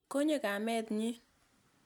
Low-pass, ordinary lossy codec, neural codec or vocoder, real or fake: 19.8 kHz; none; none; real